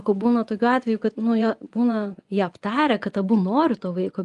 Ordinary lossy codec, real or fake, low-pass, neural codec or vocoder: Opus, 32 kbps; fake; 10.8 kHz; vocoder, 24 kHz, 100 mel bands, Vocos